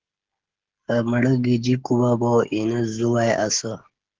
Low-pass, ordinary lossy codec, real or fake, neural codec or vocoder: 7.2 kHz; Opus, 16 kbps; fake; codec, 16 kHz, 16 kbps, FreqCodec, smaller model